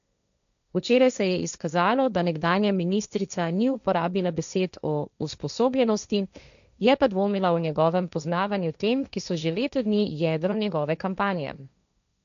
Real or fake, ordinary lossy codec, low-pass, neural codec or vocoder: fake; none; 7.2 kHz; codec, 16 kHz, 1.1 kbps, Voila-Tokenizer